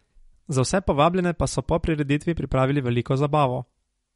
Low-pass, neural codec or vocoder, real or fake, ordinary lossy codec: 19.8 kHz; none; real; MP3, 48 kbps